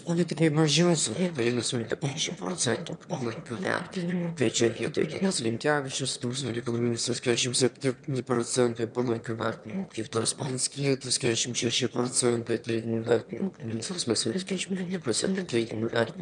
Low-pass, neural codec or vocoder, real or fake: 9.9 kHz; autoencoder, 22.05 kHz, a latent of 192 numbers a frame, VITS, trained on one speaker; fake